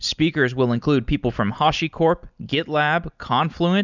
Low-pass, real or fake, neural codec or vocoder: 7.2 kHz; real; none